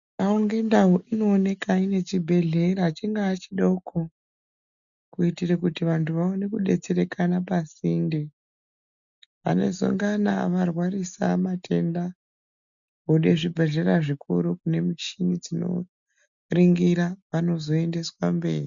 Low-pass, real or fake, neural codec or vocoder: 7.2 kHz; real; none